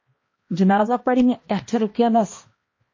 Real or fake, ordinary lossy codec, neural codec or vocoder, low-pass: fake; MP3, 32 kbps; codec, 16 kHz, 1 kbps, X-Codec, HuBERT features, trained on balanced general audio; 7.2 kHz